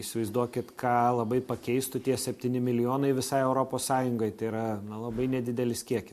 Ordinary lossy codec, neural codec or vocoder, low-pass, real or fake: MP3, 64 kbps; none; 14.4 kHz; real